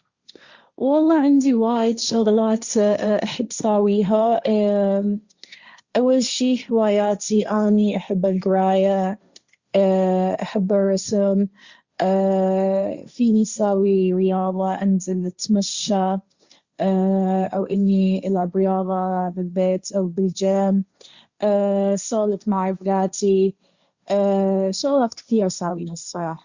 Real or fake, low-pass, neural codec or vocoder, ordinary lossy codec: fake; 7.2 kHz; codec, 16 kHz, 1.1 kbps, Voila-Tokenizer; Opus, 64 kbps